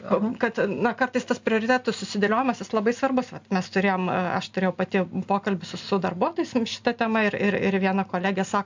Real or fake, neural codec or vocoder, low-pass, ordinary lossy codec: real; none; 7.2 kHz; MP3, 64 kbps